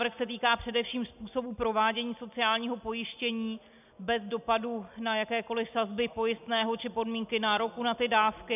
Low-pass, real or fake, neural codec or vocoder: 3.6 kHz; real; none